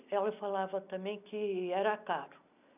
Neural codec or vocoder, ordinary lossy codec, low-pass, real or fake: none; none; 3.6 kHz; real